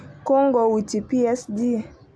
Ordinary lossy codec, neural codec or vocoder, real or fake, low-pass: none; none; real; none